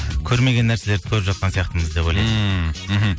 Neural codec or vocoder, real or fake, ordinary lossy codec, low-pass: none; real; none; none